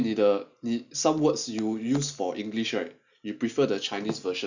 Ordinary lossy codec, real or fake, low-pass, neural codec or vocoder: none; real; 7.2 kHz; none